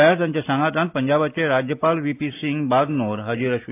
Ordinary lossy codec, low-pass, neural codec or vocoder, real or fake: AAC, 24 kbps; 3.6 kHz; none; real